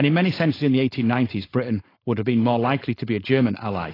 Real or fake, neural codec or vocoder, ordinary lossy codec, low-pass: fake; codec, 16 kHz, 4.8 kbps, FACodec; AAC, 24 kbps; 5.4 kHz